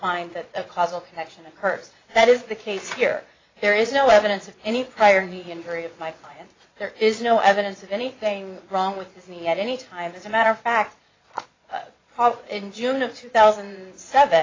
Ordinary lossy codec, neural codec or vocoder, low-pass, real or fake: AAC, 32 kbps; vocoder, 22.05 kHz, 80 mel bands, Vocos; 7.2 kHz; fake